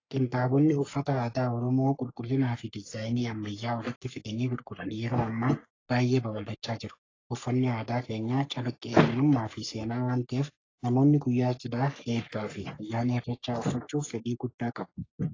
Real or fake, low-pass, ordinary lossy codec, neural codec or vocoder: fake; 7.2 kHz; AAC, 32 kbps; codec, 44.1 kHz, 3.4 kbps, Pupu-Codec